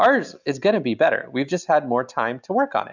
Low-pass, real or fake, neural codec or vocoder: 7.2 kHz; real; none